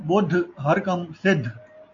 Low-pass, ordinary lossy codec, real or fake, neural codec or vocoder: 7.2 kHz; AAC, 64 kbps; real; none